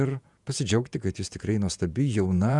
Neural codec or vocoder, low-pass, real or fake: vocoder, 48 kHz, 128 mel bands, Vocos; 10.8 kHz; fake